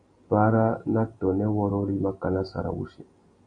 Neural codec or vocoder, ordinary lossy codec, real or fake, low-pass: none; MP3, 96 kbps; real; 9.9 kHz